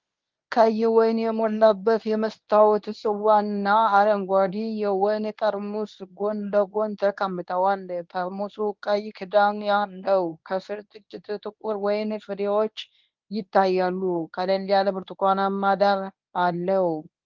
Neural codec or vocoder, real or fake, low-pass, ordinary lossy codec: codec, 24 kHz, 0.9 kbps, WavTokenizer, medium speech release version 1; fake; 7.2 kHz; Opus, 24 kbps